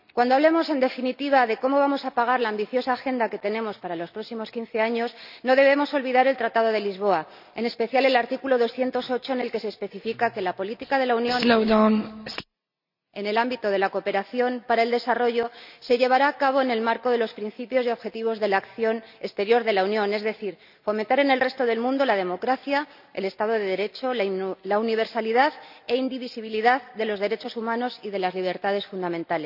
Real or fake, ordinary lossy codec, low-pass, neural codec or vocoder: real; none; 5.4 kHz; none